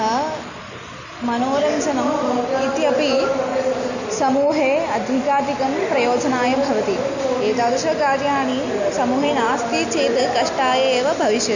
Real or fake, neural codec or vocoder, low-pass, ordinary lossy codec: real; none; 7.2 kHz; none